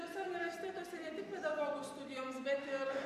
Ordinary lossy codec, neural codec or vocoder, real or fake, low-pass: MP3, 64 kbps; none; real; 14.4 kHz